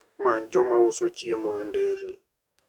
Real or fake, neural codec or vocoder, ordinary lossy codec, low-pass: fake; codec, 44.1 kHz, 2.6 kbps, DAC; none; 19.8 kHz